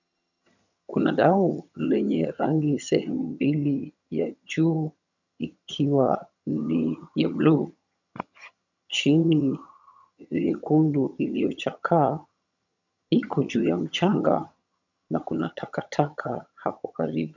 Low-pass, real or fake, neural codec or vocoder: 7.2 kHz; fake; vocoder, 22.05 kHz, 80 mel bands, HiFi-GAN